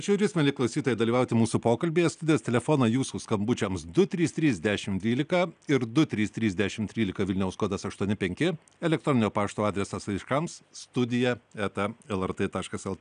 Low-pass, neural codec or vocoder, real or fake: 9.9 kHz; none; real